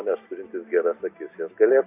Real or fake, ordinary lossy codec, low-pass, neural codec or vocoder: real; AAC, 24 kbps; 3.6 kHz; none